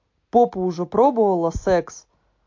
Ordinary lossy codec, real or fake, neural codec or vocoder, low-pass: MP3, 48 kbps; real; none; 7.2 kHz